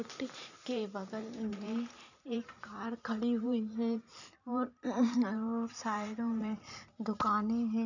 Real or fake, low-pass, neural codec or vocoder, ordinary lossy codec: fake; 7.2 kHz; vocoder, 44.1 kHz, 128 mel bands every 512 samples, BigVGAN v2; none